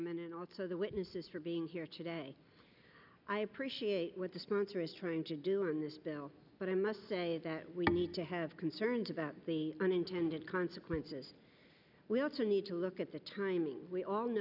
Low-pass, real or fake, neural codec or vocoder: 5.4 kHz; real; none